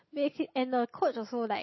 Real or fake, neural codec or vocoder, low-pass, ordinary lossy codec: real; none; 7.2 kHz; MP3, 24 kbps